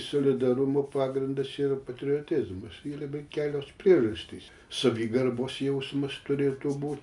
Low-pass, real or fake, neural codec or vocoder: 10.8 kHz; real; none